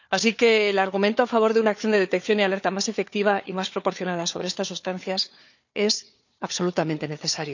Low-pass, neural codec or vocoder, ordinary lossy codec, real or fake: 7.2 kHz; codec, 16 kHz, 4 kbps, FunCodec, trained on Chinese and English, 50 frames a second; none; fake